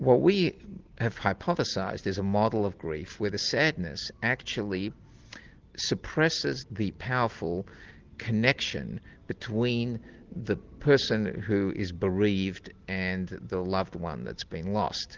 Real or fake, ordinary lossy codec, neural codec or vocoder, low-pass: real; Opus, 16 kbps; none; 7.2 kHz